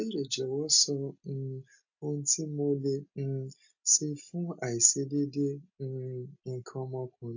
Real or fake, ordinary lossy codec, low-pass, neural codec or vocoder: real; AAC, 48 kbps; 7.2 kHz; none